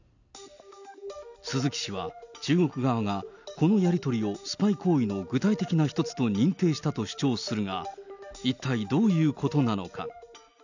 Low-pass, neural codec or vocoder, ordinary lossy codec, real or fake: 7.2 kHz; none; none; real